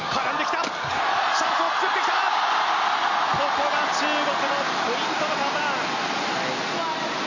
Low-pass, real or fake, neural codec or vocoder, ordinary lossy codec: 7.2 kHz; real; none; none